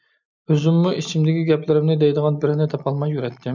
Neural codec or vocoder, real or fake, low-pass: none; real; 7.2 kHz